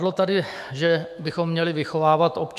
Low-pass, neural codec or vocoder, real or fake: 14.4 kHz; autoencoder, 48 kHz, 128 numbers a frame, DAC-VAE, trained on Japanese speech; fake